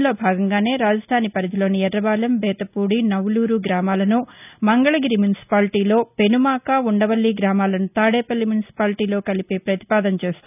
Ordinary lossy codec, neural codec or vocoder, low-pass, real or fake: none; none; 3.6 kHz; real